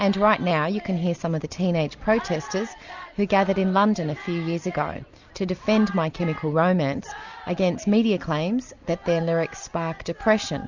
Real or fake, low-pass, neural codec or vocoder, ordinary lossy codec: real; 7.2 kHz; none; Opus, 64 kbps